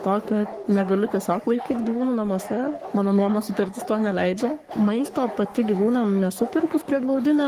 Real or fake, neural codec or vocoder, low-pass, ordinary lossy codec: fake; codec, 44.1 kHz, 3.4 kbps, Pupu-Codec; 14.4 kHz; Opus, 24 kbps